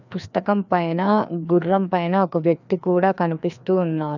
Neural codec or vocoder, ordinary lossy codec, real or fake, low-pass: codec, 16 kHz, 2 kbps, FreqCodec, larger model; none; fake; 7.2 kHz